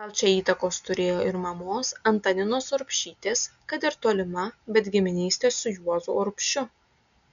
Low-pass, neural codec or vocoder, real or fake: 7.2 kHz; none; real